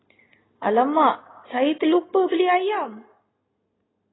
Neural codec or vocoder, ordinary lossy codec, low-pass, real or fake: none; AAC, 16 kbps; 7.2 kHz; real